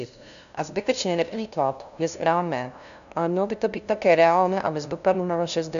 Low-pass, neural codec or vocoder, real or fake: 7.2 kHz; codec, 16 kHz, 0.5 kbps, FunCodec, trained on LibriTTS, 25 frames a second; fake